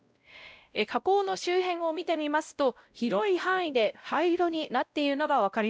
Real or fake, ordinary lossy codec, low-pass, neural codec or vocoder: fake; none; none; codec, 16 kHz, 0.5 kbps, X-Codec, WavLM features, trained on Multilingual LibriSpeech